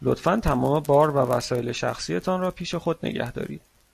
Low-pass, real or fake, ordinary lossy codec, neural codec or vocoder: 14.4 kHz; real; MP3, 64 kbps; none